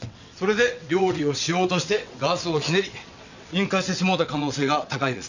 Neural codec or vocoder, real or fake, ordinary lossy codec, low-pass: vocoder, 22.05 kHz, 80 mel bands, WaveNeXt; fake; none; 7.2 kHz